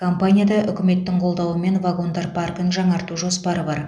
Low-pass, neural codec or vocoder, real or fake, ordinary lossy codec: none; none; real; none